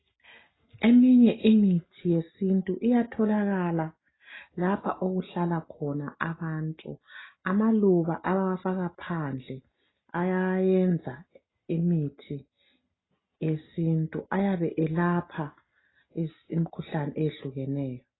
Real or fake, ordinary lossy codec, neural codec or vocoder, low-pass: real; AAC, 16 kbps; none; 7.2 kHz